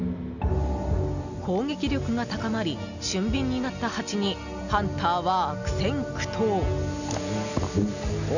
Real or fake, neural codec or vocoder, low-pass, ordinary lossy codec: real; none; 7.2 kHz; none